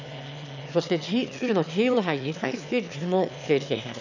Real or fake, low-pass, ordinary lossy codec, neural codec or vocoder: fake; 7.2 kHz; none; autoencoder, 22.05 kHz, a latent of 192 numbers a frame, VITS, trained on one speaker